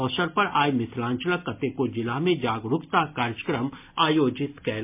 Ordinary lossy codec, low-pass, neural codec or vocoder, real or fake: MP3, 32 kbps; 3.6 kHz; none; real